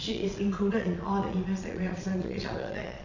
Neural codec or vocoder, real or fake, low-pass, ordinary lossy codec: vocoder, 22.05 kHz, 80 mel bands, Vocos; fake; 7.2 kHz; none